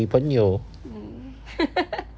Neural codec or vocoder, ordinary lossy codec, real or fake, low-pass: none; none; real; none